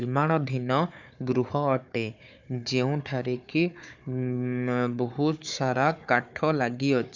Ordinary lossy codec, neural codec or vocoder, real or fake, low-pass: none; codec, 16 kHz, 4 kbps, FunCodec, trained on Chinese and English, 50 frames a second; fake; 7.2 kHz